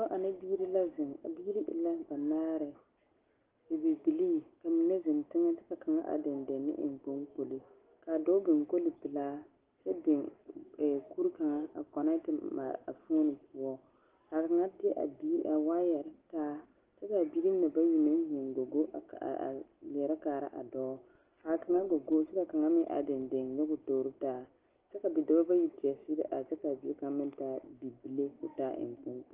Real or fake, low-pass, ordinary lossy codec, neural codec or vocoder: real; 3.6 kHz; Opus, 16 kbps; none